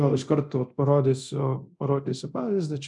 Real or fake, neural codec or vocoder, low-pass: fake; codec, 24 kHz, 0.9 kbps, DualCodec; 10.8 kHz